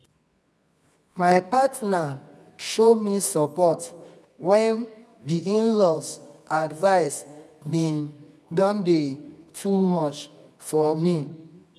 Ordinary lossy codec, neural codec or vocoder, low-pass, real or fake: none; codec, 24 kHz, 0.9 kbps, WavTokenizer, medium music audio release; none; fake